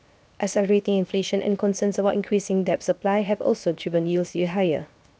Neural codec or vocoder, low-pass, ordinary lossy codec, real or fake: codec, 16 kHz, 0.7 kbps, FocalCodec; none; none; fake